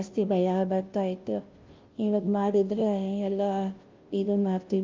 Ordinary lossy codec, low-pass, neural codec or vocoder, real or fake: Opus, 32 kbps; 7.2 kHz; codec, 16 kHz, 0.5 kbps, FunCodec, trained on Chinese and English, 25 frames a second; fake